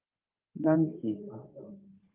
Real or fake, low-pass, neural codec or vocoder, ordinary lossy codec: fake; 3.6 kHz; codec, 16 kHz in and 24 kHz out, 2.2 kbps, FireRedTTS-2 codec; Opus, 32 kbps